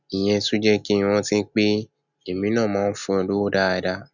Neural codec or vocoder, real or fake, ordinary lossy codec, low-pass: none; real; none; 7.2 kHz